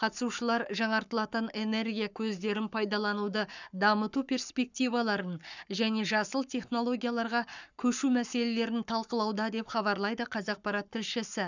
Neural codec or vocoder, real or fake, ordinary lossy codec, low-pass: codec, 44.1 kHz, 7.8 kbps, Pupu-Codec; fake; none; 7.2 kHz